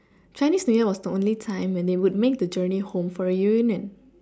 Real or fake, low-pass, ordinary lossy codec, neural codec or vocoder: real; none; none; none